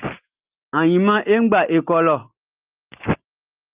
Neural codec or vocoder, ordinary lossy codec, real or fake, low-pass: none; Opus, 24 kbps; real; 3.6 kHz